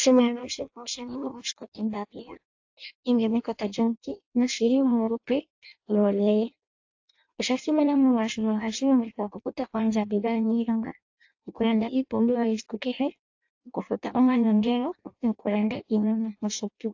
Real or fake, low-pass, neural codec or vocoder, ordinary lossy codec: fake; 7.2 kHz; codec, 16 kHz in and 24 kHz out, 0.6 kbps, FireRedTTS-2 codec; AAC, 48 kbps